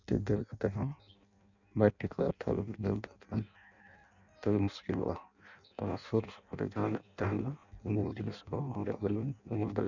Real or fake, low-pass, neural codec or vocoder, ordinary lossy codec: fake; 7.2 kHz; codec, 16 kHz in and 24 kHz out, 0.6 kbps, FireRedTTS-2 codec; none